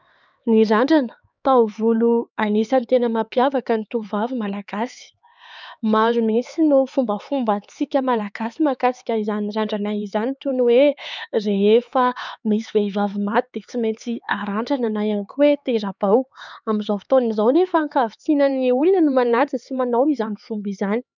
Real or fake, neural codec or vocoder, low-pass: fake; codec, 16 kHz, 4 kbps, X-Codec, HuBERT features, trained on LibriSpeech; 7.2 kHz